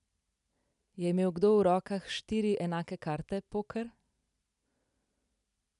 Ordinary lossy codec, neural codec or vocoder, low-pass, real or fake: none; none; 10.8 kHz; real